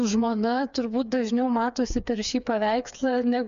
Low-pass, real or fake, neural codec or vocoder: 7.2 kHz; fake; codec, 16 kHz, 4 kbps, FreqCodec, smaller model